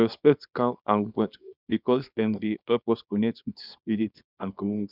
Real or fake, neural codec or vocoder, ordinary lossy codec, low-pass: fake; codec, 24 kHz, 0.9 kbps, WavTokenizer, small release; none; 5.4 kHz